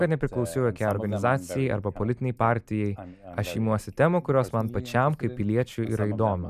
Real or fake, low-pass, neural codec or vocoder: real; 14.4 kHz; none